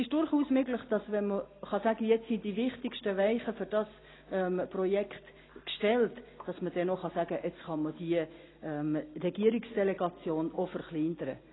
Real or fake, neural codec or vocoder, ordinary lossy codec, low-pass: real; none; AAC, 16 kbps; 7.2 kHz